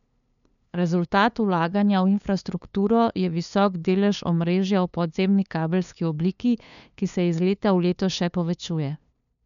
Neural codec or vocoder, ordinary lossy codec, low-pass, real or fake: codec, 16 kHz, 2 kbps, FunCodec, trained on LibriTTS, 25 frames a second; none; 7.2 kHz; fake